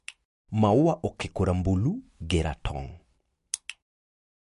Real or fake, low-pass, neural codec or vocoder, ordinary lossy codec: real; 14.4 kHz; none; MP3, 48 kbps